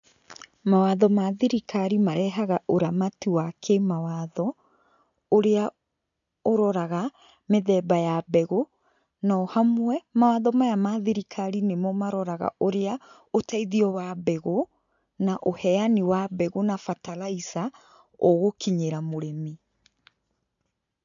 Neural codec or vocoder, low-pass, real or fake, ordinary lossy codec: none; 7.2 kHz; real; none